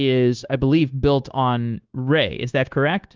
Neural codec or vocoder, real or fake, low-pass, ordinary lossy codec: codec, 16 kHz, 0.9 kbps, LongCat-Audio-Codec; fake; 7.2 kHz; Opus, 32 kbps